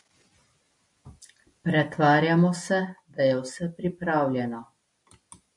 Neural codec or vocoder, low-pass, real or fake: none; 10.8 kHz; real